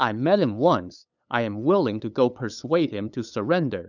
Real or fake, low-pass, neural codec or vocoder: fake; 7.2 kHz; codec, 16 kHz, 8 kbps, FunCodec, trained on LibriTTS, 25 frames a second